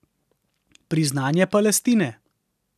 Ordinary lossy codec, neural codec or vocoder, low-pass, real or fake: none; none; 14.4 kHz; real